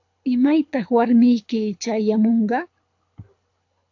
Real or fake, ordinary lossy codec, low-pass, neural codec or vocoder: fake; AAC, 48 kbps; 7.2 kHz; codec, 24 kHz, 6 kbps, HILCodec